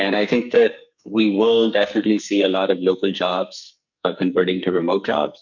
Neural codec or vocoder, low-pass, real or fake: codec, 44.1 kHz, 2.6 kbps, SNAC; 7.2 kHz; fake